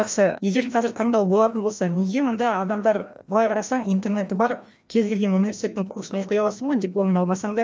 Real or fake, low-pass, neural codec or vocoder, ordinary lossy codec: fake; none; codec, 16 kHz, 1 kbps, FreqCodec, larger model; none